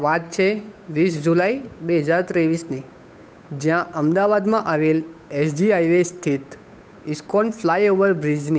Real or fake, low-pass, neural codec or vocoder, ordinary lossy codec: fake; none; codec, 16 kHz, 8 kbps, FunCodec, trained on Chinese and English, 25 frames a second; none